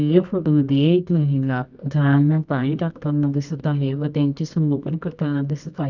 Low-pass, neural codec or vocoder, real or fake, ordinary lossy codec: 7.2 kHz; codec, 24 kHz, 0.9 kbps, WavTokenizer, medium music audio release; fake; none